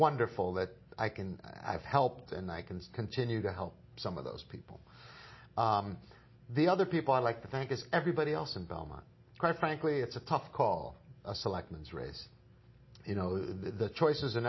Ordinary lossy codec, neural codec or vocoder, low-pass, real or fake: MP3, 24 kbps; none; 7.2 kHz; real